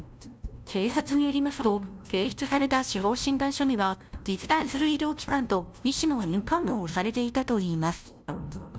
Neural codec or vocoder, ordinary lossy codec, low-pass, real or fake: codec, 16 kHz, 0.5 kbps, FunCodec, trained on LibriTTS, 25 frames a second; none; none; fake